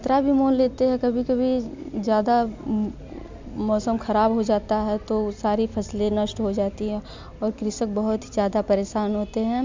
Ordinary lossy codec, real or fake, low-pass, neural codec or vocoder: MP3, 64 kbps; real; 7.2 kHz; none